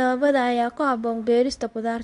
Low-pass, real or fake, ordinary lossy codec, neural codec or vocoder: 10.8 kHz; fake; none; codec, 24 kHz, 0.9 kbps, WavTokenizer, medium speech release version 2